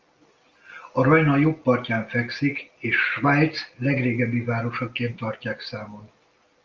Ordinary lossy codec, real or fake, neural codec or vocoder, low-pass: Opus, 32 kbps; real; none; 7.2 kHz